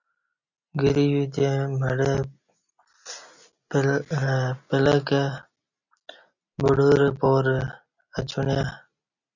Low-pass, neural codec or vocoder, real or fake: 7.2 kHz; none; real